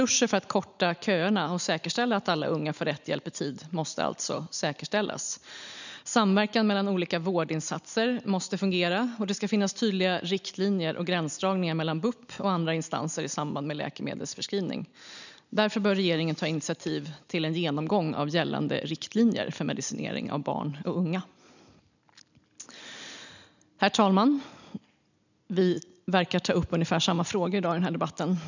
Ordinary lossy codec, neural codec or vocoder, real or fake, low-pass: none; none; real; 7.2 kHz